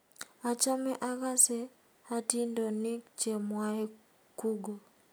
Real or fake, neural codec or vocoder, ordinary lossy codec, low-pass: real; none; none; none